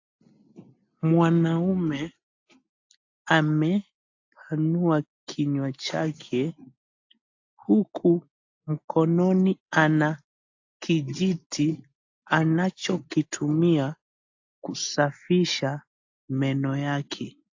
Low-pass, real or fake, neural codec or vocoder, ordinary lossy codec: 7.2 kHz; real; none; AAC, 48 kbps